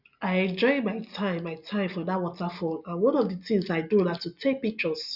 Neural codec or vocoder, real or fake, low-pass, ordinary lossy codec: none; real; 5.4 kHz; none